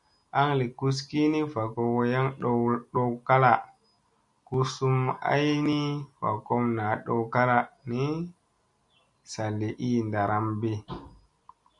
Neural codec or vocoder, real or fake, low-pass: none; real; 10.8 kHz